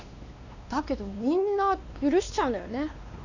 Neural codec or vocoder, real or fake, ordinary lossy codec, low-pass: codec, 16 kHz, 2 kbps, X-Codec, WavLM features, trained on Multilingual LibriSpeech; fake; none; 7.2 kHz